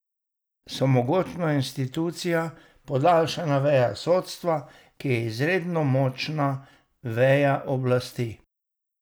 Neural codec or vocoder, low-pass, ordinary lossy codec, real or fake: none; none; none; real